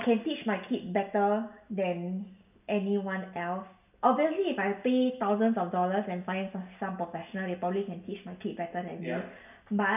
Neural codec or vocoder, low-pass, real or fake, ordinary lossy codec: none; 3.6 kHz; real; none